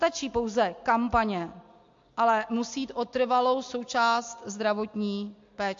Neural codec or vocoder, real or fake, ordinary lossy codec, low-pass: none; real; MP3, 48 kbps; 7.2 kHz